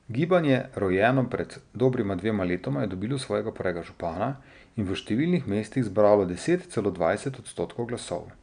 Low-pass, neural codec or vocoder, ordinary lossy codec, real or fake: 9.9 kHz; none; none; real